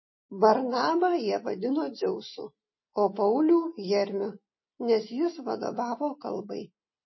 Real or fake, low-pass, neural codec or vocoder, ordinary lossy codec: real; 7.2 kHz; none; MP3, 24 kbps